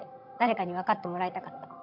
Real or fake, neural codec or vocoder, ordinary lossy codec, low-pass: fake; vocoder, 22.05 kHz, 80 mel bands, HiFi-GAN; AAC, 48 kbps; 5.4 kHz